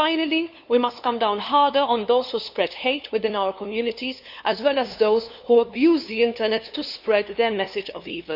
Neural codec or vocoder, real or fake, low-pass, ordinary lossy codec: codec, 16 kHz, 2 kbps, FunCodec, trained on LibriTTS, 25 frames a second; fake; 5.4 kHz; none